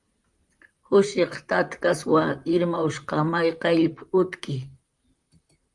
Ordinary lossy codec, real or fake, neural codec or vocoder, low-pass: Opus, 32 kbps; fake; vocoder, 44.1 kHz, 128 mel bands, Pupu-Vocoder; 10.8 kHz